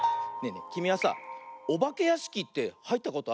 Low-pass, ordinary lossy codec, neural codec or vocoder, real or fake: none; none; none; real